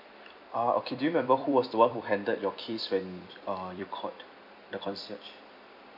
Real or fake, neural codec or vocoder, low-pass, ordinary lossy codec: fake; vocoder, 44.1 kHz, 128 mel bands every 256 samples, BigVGAN v2; 5.4 kHz; none